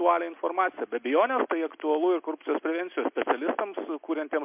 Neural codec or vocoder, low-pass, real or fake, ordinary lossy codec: none; 3.6 kHz; real; MP3, 32 kbps